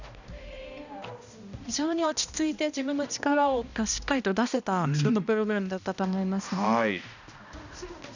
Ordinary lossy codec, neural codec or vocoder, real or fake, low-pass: none; codec, 16 kHz, 1 kbps, X-Codec, HuBERT features, trained on balanced general audio; fake; 7.2 kHz